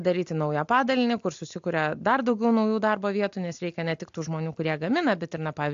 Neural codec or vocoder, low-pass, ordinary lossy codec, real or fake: none; 7.2 kHz; AAC, 64 kbps; real